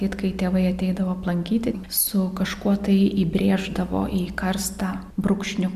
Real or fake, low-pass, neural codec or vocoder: real; 14.4 kHz; none